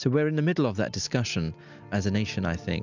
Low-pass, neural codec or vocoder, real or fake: 7.2 kHz; none; real